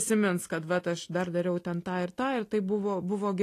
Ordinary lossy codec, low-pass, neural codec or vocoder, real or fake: AAC, 48 kbps; 14.4 kHz; none; real